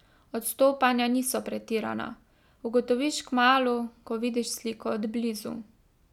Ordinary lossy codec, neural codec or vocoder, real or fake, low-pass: none; none; real; 19.8 kHz